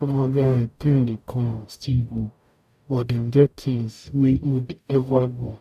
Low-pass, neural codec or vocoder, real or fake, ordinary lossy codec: 14.4 kHz; codec, 44.1 kHz, 0.9 kbps, DAC; fake; none